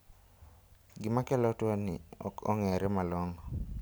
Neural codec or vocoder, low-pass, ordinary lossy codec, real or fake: none; none; none; real